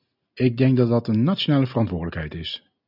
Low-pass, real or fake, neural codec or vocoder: 5.4 kHz; real; none